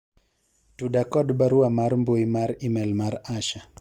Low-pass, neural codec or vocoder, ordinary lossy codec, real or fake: 19.8 kHz; none; Opus, 32 kbps; real